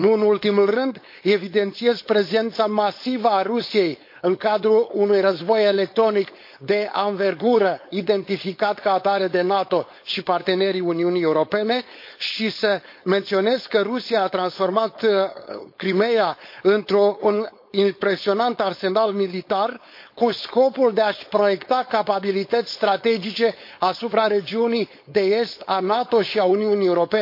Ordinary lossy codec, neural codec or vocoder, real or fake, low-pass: MP3, 32 kbps; codec, 16 kHz, 4.8 kbps, FACodec; fake; 5.4 kHz